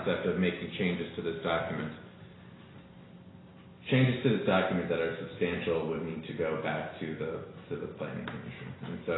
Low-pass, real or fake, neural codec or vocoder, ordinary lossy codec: 7.2 kHz; real; none; AAC, 16 kbps